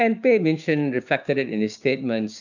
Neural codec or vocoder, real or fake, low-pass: codec, 16 kHz, 6 kbps, DAC; fake; 7.2 kHz